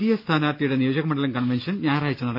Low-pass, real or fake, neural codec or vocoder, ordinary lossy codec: 5.4 kHz; real; none; none